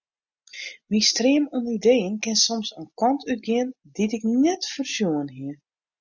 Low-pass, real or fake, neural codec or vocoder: 7.2 kHz; real; none